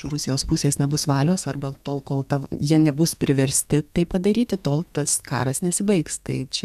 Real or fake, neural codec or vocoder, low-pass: fake; codec, 32 kHz, 1.9 kbps, SNAC; 14.4 kHz